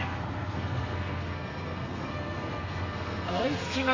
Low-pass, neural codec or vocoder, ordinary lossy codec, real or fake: 7.2 kHz; codec, 32 kHz, 1.9 kbps, SNAC; MP3, 48 kbps; fake